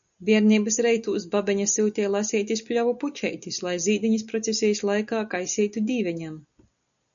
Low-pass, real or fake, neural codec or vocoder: 7.2 kHz; real; none